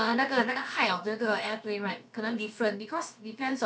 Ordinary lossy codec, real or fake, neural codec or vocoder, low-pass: none; fake; codec, 16 kHz, about 1 kbps, DyCAST, with the encoder's durations; none